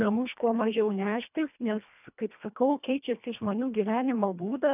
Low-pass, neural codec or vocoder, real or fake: 3.6 kHz; codec, 24 kHz, 1.5 kbps, HILCodec; fake